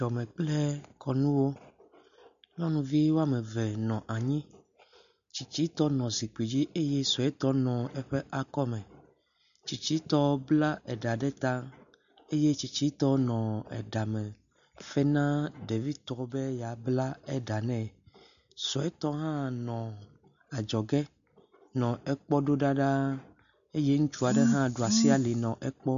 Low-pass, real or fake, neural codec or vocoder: 7.2 kHz; real; none